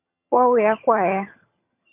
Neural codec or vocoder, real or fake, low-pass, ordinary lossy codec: vocoder, 22.05 kHz, 80 mel bands, HiFi-GAN; fake; 3.6 kHz; MP3, 32 kbps